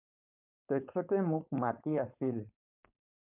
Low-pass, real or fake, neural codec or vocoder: 3.6 kHz; fake; codec, 16 kHz, 4.8 kbps, FACodec